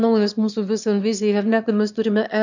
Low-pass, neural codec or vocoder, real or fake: 7.2 kHz; autoencoder, 22.05 kHz, a latent of 192 numbers a frame, VITS, trained on one speaker; fake